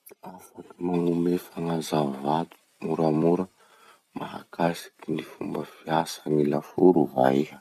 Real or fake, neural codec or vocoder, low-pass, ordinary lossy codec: fake; vocoder, 44.1 kHz, 128 mel bands every 256 samples, BigVGAN v2; 14.4 kHz; none